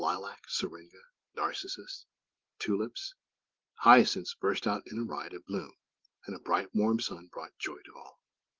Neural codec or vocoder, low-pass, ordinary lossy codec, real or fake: none; 7.2 kHz; Opus, 16 kbps; real